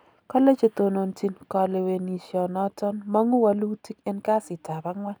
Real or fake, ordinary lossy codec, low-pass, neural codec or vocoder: real; none; none; none